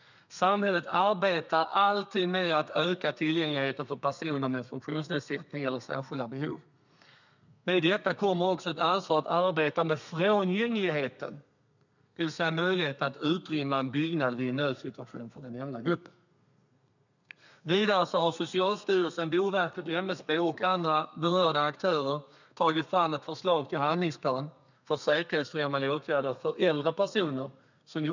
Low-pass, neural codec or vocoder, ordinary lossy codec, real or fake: 7.2 kHz; codec, 32 kHz, 1.9 kbps, SNAC; none; fake